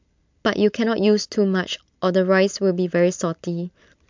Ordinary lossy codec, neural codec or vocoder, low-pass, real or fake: MP3, 64 kbps; none; 7.2 kHz; real